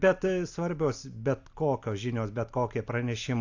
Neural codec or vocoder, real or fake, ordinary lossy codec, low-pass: none; real; AAC, 48 kbps; 7.2 kHz